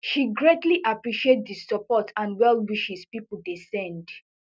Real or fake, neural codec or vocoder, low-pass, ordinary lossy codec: real; none; none; none